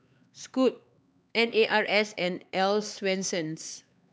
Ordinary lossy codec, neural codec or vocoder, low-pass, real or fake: none; codec, 16 kHz, 4 kbps, X-Codec, HuBERT features, trained on LibriSpeech; none; fake